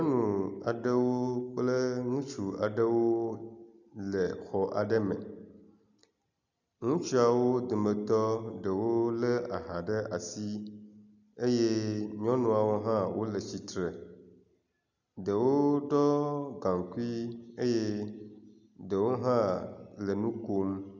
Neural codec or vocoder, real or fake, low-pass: none; real; 7.2 kHz